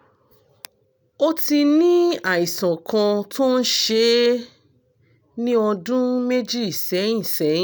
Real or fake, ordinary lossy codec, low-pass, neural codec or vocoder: real; none; none; none